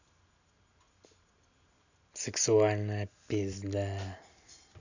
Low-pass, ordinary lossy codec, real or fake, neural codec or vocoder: 7.2 kHz; none; real; none